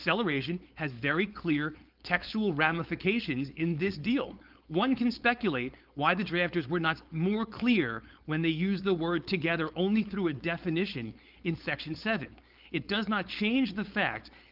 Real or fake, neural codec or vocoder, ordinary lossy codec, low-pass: fake; codec, 16 kHz, 4.8 kbps, FACodec; Opus, 32 kbps; 5.4 kHz